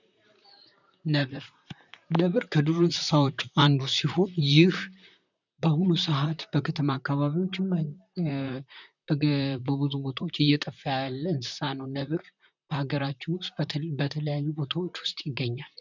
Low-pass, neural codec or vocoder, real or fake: 7.2 kHz; vocoder, 44.1 kHz, 128 mel bands, Pupu-Vocoder; fake